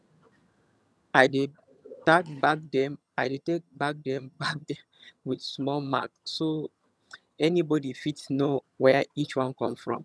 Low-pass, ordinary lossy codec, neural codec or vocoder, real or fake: none; none; vocoder, 22.05 kHz, 80 mel bands, HiFi-GAN; fake